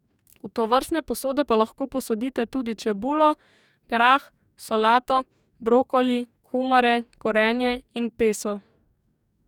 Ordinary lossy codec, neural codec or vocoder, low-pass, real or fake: none; codec, 44.1 kHz, 2.6 kbps, DAC; 19.8 kHz; fake